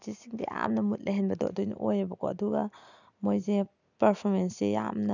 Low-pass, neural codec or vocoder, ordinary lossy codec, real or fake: 7.2 kHz; none; none; real